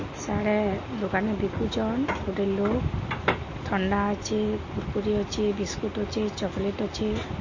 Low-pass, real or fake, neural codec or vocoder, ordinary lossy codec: 7.2 kHz; real; none; MP3, 32 kbps